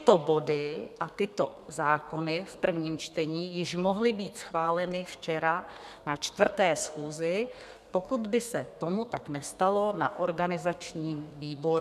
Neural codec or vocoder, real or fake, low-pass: codec, 32 kHz, 1.9 kbps, SNAC; fake; 14.4 kHz